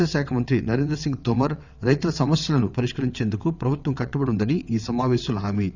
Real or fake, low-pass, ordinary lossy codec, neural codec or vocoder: fake; 7.2 kHz; none; vocoder, 22.05 kHz, 80 mel bands, WaveNeXt